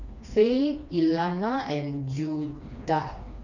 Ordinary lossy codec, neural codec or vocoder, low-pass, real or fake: none; codec, 16 kHz, 2 kbps, FreqCodec, smaller model; 7.2 kHz; fake